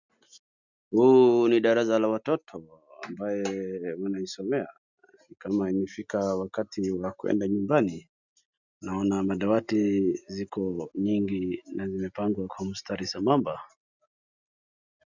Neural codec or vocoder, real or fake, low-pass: none; real; 7.2 kHz